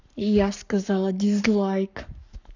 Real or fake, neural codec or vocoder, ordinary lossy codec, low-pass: fake; codec, 44.1 kHz, 7.8 kbps, Pupu-Codec; none; 7.2 kHz